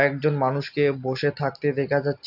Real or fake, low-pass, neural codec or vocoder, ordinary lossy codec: real; 5.4 kHz; none; none